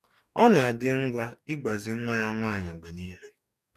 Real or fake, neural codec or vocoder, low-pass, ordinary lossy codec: fake; codec, 44.1 kHz, 2.6 kbps, DAC; 14.4 kHz; none